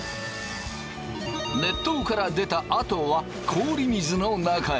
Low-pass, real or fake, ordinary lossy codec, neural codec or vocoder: none; real; none; none